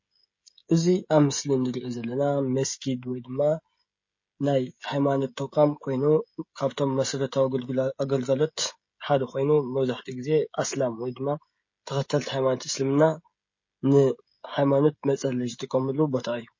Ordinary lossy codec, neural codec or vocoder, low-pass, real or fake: MP3, 32 kbps; codec, 16 kHz, 16 kbps, FreqCodec, smaller model; 7.2 kHz; fake